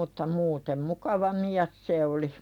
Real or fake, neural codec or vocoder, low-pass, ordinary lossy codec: real; none; 19.8 kHz; none